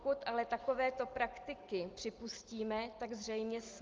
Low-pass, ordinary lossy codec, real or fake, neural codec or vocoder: 7.2 kHz; Opus, 32 kbps; real; none